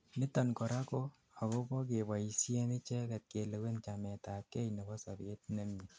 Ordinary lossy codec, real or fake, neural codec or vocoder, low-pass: none; real; none; none